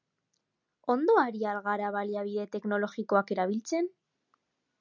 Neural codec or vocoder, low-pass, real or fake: none; 7.2 kHz; real